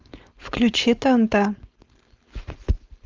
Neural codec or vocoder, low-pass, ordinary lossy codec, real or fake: codec, 16 kHz, 4.8 kbps, FACodec; 7.2 kHz; Opus, 32 kbps; fake